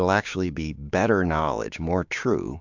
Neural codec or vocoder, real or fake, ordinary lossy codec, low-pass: codec, 16 kHz, 6 kbps, DAC; fake; MP3, 64 kbps; 7.2 kHz